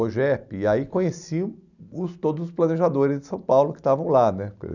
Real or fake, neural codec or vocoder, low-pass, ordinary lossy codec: real; none; 7.2 kHz; none